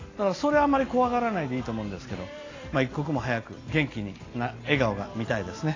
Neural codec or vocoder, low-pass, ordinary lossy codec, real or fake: none; 7.2 kHz; AAC, 32 kbps; real